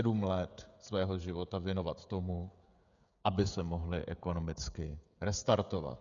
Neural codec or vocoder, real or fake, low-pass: codec, 16 kHz, 16 kbps, FreqCodec, smaller model; fake; 7.2 kHz